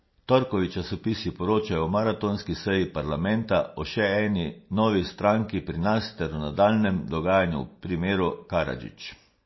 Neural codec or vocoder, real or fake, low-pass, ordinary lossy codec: none; real; 7.2 kHz; MP3, 24 kbps